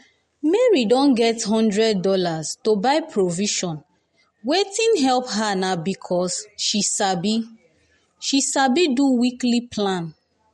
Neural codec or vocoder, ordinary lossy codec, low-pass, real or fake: none; MP3, 48 kbps; 19.8 kHz; real